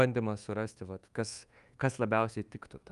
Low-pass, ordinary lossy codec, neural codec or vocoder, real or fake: 10.8 kHz; Opus, 32 kbps; codec, 24 kHz, 1.2 kbps, DualCodec; fake